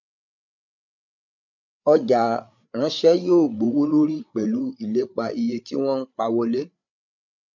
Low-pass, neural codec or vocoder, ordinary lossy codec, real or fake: 7.2 kHz; codec, 16 kHz, 8 kbps, FreqCodec, larger model; none; fake